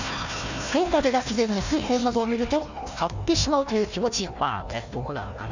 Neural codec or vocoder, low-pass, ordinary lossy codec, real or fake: codec, 16 kHz, 1 kbps, FunCodec, trained on Chinese and English, 50 frames a second; 7.2 kHz; none; fake